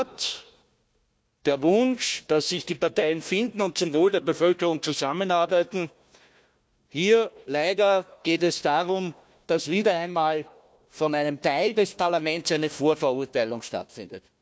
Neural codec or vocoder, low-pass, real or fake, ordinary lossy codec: codec, 16 kHz, 1 kbps, FunCodec, trained on Chinese and English, 50 frames a second; none; fake; none